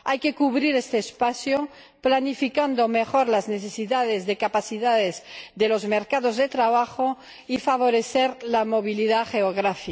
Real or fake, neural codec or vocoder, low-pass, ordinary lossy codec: real; none; none; none